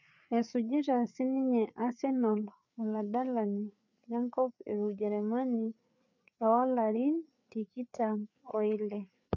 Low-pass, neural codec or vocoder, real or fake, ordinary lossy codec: 7.2 kHz; codec, 16 kHz, 4 kbps, FreqCodec, larger model; fake; none